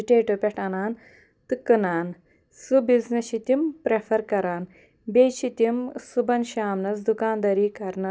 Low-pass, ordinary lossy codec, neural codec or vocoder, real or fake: none; none; none; real